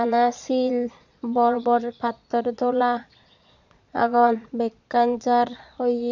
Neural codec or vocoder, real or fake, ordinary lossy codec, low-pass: vocoder, 22.05 kHz, 80 mel bands, Vocos; fake; none; 7.2 kHz